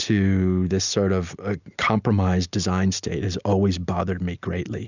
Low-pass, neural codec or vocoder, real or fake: 7.2 kHz; none; real